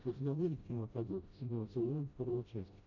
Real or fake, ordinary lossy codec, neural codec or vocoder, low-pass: fake; MP3, 48 kbps; codec, 16 kHz, 0.5 kbps, FreqCodec, smaller model; 7.2 kHz